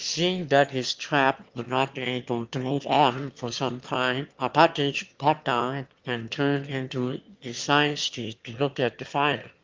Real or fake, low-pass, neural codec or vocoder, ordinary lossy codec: fake; 7.2 kHz; autoencoder, 22.05 kHz, a latent of 192 numbers a frame, VITS, trained on one speaker; Opus, 32 kbps